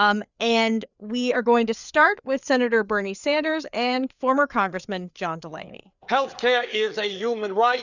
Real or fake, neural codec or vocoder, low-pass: fake; codec, 16 kHz, 4 kbps, FreqCodec, larger model; 7.2 kHz